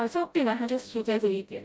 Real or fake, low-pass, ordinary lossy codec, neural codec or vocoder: fake; none; none; codec, 16 kHz, 0.5 kbps, FreqCodec, smaller model